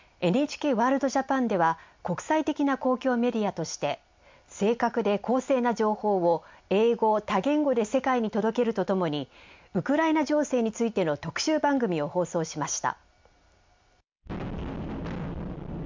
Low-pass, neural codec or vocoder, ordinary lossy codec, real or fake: 7.2 kHz; none; MP3, 64 kbps; real